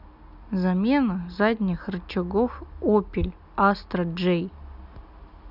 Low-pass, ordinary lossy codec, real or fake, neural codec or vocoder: 5.4 kHz; none; real; none